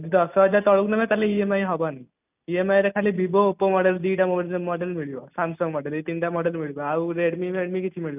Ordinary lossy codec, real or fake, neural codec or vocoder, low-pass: none; real; none; 3.6 kHz